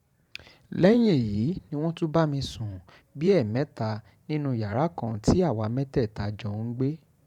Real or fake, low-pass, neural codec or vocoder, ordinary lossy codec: fake; 19.8 kHz; vocoder, 44.1 kHz, 128 mel bands every 512 samples, BigVGAN v2; none